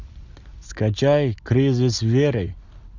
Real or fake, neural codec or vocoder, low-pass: real; none; 7.2 kHz